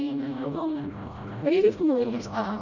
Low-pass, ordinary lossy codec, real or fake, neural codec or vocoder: 7.2 kHz; none; fake; codec, 16 kHz, 0.5 kbps, FreqCodec, smaller model